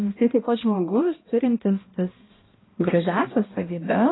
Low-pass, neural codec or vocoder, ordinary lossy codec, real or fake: 7.2 kHz; codec, 16 kHz, 1 kbps, X-Codec, HuBERT features, trained on balanced general audio; AAC, 16 kbps; fake